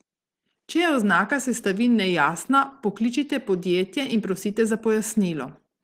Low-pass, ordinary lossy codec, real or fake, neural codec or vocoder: 14.4 kHz; Opus, 16 kbps; real; none